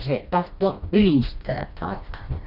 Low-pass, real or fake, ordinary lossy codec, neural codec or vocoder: 5.4 kHz; fake; none; codec, 16 kHz, 1 kbps, FreqCodec, smaller model